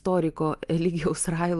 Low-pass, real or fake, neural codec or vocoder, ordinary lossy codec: 10.8 kHz; real; none; Opus, 32 kbps